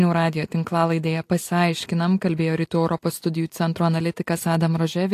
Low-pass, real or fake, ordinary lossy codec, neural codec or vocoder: 14.4 kHz; real; AAC, 64 kbps; none